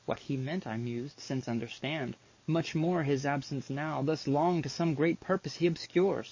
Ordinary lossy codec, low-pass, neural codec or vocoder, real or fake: MP3, 32 kbps; 7.2 kHz; codec, 44.1 kHz, 7.8 kbps, DAC; fake